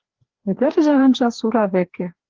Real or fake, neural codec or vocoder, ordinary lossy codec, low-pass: fake; codec, 16 kHz, 2 kbps, FreqCodec, larger model; Opus, 16 kbps; 7.2 kHz